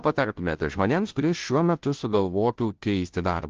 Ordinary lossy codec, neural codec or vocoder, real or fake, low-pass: Opus, 16 kbps; codec, 16 kHz, 0.5 kbps, FunCodec, trained on Chinese and English, 25 frames a second; fake; 7.2 kHz